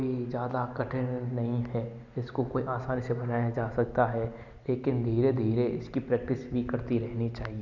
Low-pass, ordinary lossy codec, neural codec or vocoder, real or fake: 7.2 kHz; none; none; real